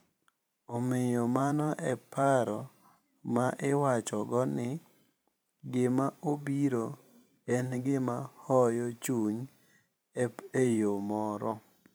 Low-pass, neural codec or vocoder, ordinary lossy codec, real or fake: none; vocoder, 44.1 kHz, 128 mel bands every 512 samples, BigVGAN v2; none; fake